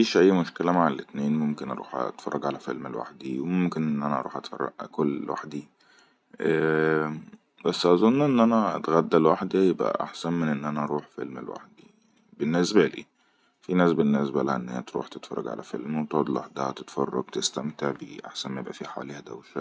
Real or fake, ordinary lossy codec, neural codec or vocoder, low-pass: real; none; none; none